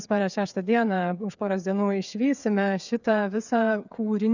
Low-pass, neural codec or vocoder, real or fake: 7.2 kHz; codec, 16 kHz, 8 kbps, FreqCodec, smaller model; fake